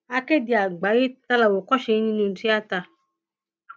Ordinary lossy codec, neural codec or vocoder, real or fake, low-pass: none; none; real; none